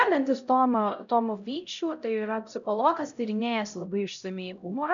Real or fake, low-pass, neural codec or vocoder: fake; 7.2 kHz; codec, 16 kHz, 0.5 kbps, X-Codec, HuBERT features, trained on LibriSpeech